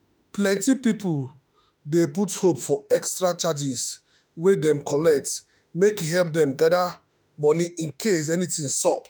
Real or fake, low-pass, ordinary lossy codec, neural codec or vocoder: fake; none; none; autoencoder, 48 kHz, 32 numbers a frame, DAC-VAE, trained on Japanese speech